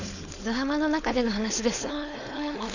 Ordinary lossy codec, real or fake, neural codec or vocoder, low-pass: none; fake; codec, 16 kHz, 4.8 kbps, FACodec; 7.2 kHz